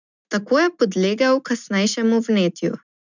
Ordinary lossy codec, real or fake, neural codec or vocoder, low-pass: none; real; none; 7.2 kHz